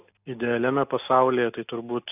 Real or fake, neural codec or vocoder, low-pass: real; none; 3.6 kHz